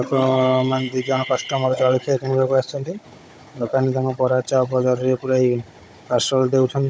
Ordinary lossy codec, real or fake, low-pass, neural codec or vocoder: none; fake; none; codec, 16 kHz, 16 kbps, FunCodec, trained on Chinese and English, 50 frames a second